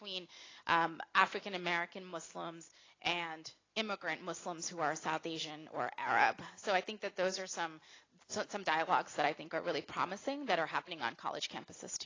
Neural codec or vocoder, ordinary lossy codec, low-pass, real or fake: none; AAC, 32 kbps; 7.2 kHz; real